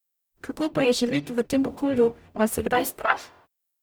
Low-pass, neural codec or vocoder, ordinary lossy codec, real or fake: none; codec, 44.1 kHz, 0.9 kbps, DAC; none; fake